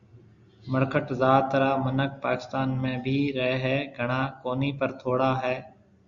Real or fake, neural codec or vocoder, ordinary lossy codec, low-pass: real; none; Opus, 64 kbps; 7.2 kHz